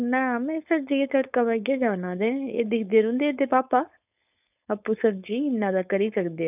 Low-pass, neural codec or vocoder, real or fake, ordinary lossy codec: 3.6 kHz; codec, 16 kHz, 4.8 kbps, FACodec; fake; none